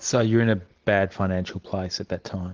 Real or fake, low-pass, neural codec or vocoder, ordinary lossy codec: real; 7.2 kHz; none; Opus, 16 kbps